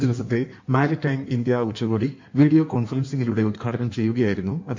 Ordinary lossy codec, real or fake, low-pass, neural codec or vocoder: MP3, 48 kbps; fake; 7.2 kHz; codec, 16 kHz in and 24 kHz out, 1.1 kbps, FireRedTTS-2 codec